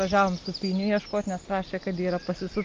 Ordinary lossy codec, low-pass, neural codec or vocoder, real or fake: Opus, 24 kbps; 7.2 kHz; none; real